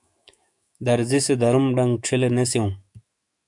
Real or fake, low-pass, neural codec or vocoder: fake; 10.8 kHz; autoencoder, 48 kHz, 128 numbers a frame, DAC-VAE, trained on Japanese speech